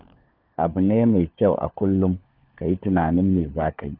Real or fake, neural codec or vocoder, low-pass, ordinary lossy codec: fake; codec, 16 kHz, 4 kbps, FunCodec, trained on LibriTTS, 50 frames a second; 5.4 kHz; none